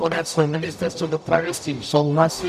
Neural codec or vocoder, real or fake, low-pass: codec, 44.1 kHz, 0.9 kbps, DAC; fake; 14.4 kHz